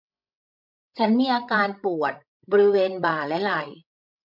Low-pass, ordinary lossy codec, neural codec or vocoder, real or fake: 5.4 kHz; MP3, 48 kbps; codec, 16 kHz, 16 kbps, FreqCodec, larger model; fake